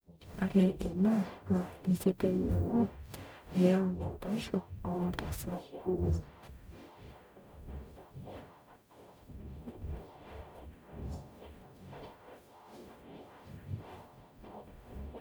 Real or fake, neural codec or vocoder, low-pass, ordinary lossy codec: fake; codec, 44.1 kHz, 0.9 kbps, DAC; none; none